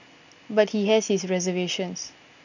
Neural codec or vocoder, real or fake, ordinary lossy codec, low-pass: none; real; none; 7.2 kHz